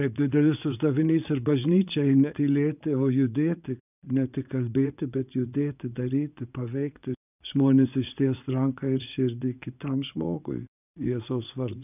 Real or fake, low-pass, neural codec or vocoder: fake; 3.6 kHz; vocoder, 44.1 kHz, 80 mel bands, Vocos